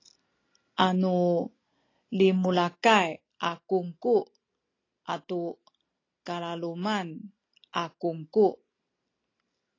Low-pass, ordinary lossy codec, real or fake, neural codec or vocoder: 7.2 kHz; AAC, 32 kbps; real; none